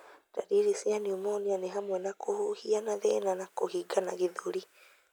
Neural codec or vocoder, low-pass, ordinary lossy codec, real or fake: none; none; none; real